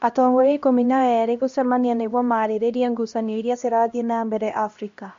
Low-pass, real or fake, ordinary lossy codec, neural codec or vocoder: 7.2 kHz; fake; MP3, 48 kbps; codec, 16 kHz, 1 kbps, X-Codec, HuBERT features, trained on LibriSpeech